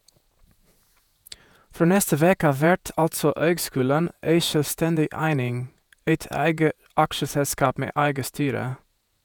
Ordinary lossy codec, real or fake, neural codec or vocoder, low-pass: none; fake; vocoder, 48 kHz, 128 mel bands, Vocos; none